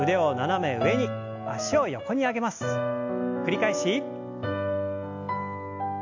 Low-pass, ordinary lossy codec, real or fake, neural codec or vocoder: 7.2 kHz; AAC, 48 kbps; real; none